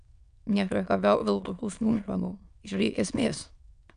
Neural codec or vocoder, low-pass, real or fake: autoencoder, 22.05 kHz, a latent of 192 numbers a frame, VITS, trained on many speakers; 9.9 kHz; fake